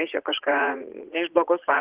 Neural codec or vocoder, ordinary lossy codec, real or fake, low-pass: vocoder, 44.1 kHz, 80 mel bands, Vocos; Opus, 16 kbps; fake; 3.6 kHz